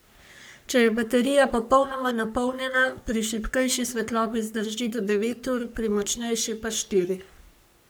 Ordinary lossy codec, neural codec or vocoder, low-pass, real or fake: none; codec, 44.1 kHz, 3.4 kbps, Pupu-Codec; none; fake